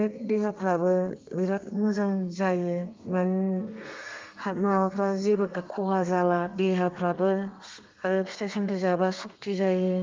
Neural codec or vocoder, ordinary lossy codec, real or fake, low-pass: codec, 32 kHz, 1.9 kbps, SNAC; Opus, 32 kbps; fake; 7.2 kHz